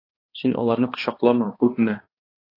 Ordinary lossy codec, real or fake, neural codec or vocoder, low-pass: AAC, 24 kbps; fake; codec, 16 kHz, 2 kbps, X-Codec, HuBERT features, trained on balanced general audio; 5.4 kHz